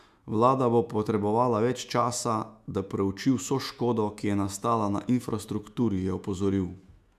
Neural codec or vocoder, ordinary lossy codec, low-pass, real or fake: autoencoder, 48 kHz, 128 numbers a frame, DAC-VAE, trained on Japanese speech; none; 14.4 kHz; fake